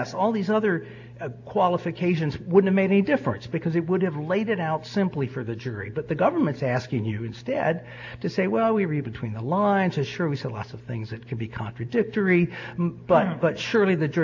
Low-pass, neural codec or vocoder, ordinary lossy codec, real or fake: 7.2 kHz; none; AAC, 48 kbps; real